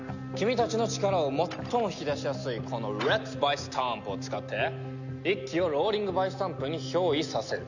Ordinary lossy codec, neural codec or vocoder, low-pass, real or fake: none; none; 7.2 kHz; real